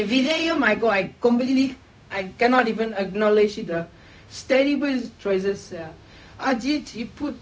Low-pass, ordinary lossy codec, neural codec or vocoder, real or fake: none; none; codec, 16 kHz, 0.4 kbps, LongCat-Audio-Codec; fake